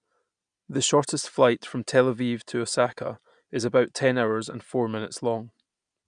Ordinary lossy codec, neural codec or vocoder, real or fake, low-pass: none; none; real; 9.9 kHz